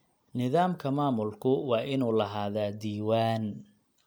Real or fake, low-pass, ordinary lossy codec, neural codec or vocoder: real; none; none; none